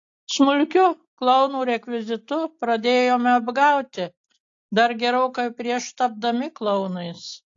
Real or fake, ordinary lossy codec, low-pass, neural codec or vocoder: real; AAC, 48 kbps; 7.2 kHz; none